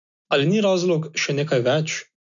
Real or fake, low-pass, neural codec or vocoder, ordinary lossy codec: real; 7.2 kHz; none; none